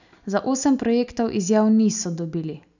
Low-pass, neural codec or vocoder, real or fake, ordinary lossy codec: 7.2 kHz; none; real; none